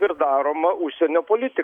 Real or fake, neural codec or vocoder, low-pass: real; none; 19.8 kHz